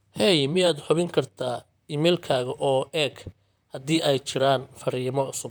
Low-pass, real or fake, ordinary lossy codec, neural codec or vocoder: none; fake; none; vocoder, 44.1 kHz, 128 mel bands, Pupu-Vocoder